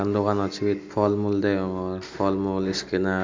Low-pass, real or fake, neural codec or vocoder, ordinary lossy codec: 7.2 kHz; real; none; MP3, 64 kbps